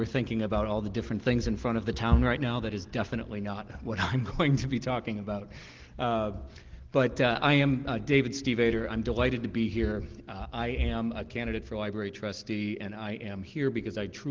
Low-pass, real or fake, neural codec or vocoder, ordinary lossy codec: 7.2 kHz; real; none; Opus, 16 kbps